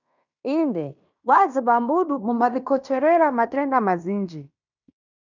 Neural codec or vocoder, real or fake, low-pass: codec, 16 kHz in and 24 kHz out, 0.9 kbps, LongCat-Audio-Codec, fine tuned four codebook decoder; fake; 7.2 kHz